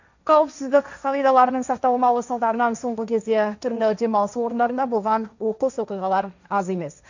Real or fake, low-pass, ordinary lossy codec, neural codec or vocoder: fake; 7.2 kHz; none; codec, 16 kHz, 1.1 kbps, Voila-Tokenizer